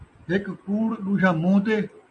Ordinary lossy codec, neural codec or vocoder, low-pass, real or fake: MP3, 64 kbps; none; 9.9 kHz; real